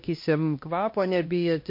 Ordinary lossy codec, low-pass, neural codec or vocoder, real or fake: MP3, 32 kbps; 5.4 kHz; codec, 16 kHz, 1 kbps, X-Codec, HuBERT features, trained on LibriSpeech; fake